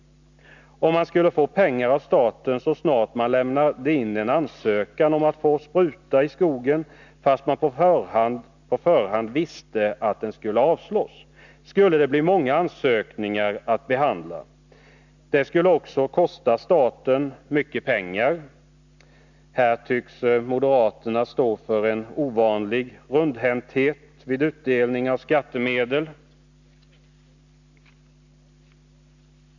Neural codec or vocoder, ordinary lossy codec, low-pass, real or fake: none; none; 7.2 kHz; real